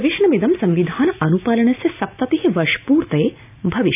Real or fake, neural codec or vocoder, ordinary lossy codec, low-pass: real; none; AAC, 32 kbps; 3.6 kHz